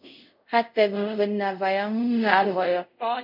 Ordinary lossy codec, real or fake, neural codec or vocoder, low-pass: MP3, 48 kbps; fake; codec, 24 kHz, 0.5 kbps, DualCodec; 5.4 kHz